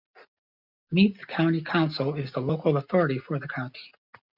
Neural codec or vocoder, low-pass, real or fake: none; 5.4 kHz; real